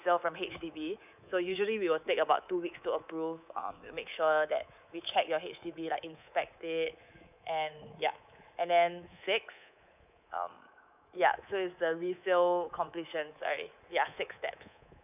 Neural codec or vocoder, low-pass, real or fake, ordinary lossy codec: codec, 24 kHz, 3.1 kbps, DualCodec; 3.6 kHz; fake; none